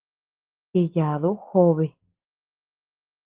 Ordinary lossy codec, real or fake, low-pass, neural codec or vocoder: Opus, 16 kbps; real; 3.6 kHz; none